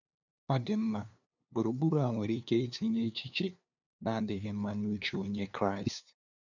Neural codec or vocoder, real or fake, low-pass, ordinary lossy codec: codec, 16 kHz, 2 kbps, FunCodec, trained on LibriTTS, 25 frames a second; fake; 7.2 kHz; none